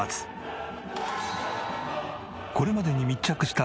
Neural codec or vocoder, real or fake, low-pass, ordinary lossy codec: none; real; none; none